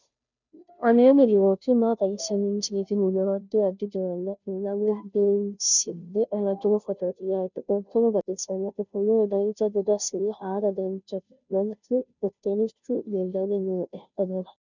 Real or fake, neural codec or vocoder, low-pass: fake; codec, 16 kHz, 0.5 kbps, FunCodec, trained on Chinese and English, 25 frames a second; 7.2 kHz